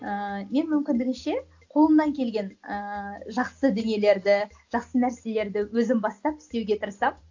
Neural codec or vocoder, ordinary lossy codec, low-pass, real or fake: none; AAC, 48 kbps; 7.2 kHz; real